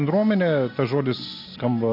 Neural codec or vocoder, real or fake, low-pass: none; real; 5.4 kHz